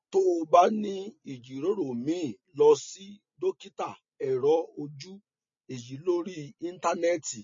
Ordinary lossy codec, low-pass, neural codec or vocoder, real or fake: MP3, 32 kbps; 7.2 kHz; none; real